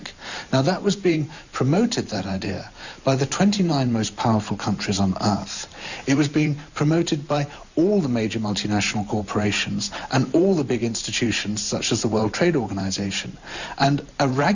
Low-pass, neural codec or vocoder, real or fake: 7.2 kHz; vocoder, 44.1 kHz, 128 mel bands every 512 samples, BigVGAN v2; fake